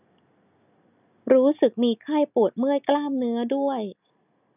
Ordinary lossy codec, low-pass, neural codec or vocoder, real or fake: none; 3.6 kHz; none; real